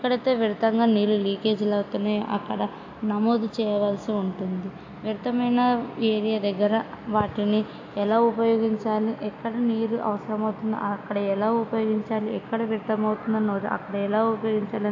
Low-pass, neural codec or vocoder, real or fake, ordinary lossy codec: 7.2 kHz; none; real; AAC, 48 kbps